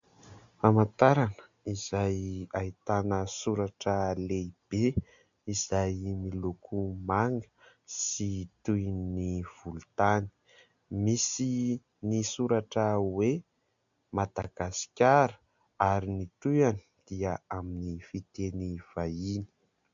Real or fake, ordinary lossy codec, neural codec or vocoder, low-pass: real; MP3, 64 kbps; none; 7.2 kHz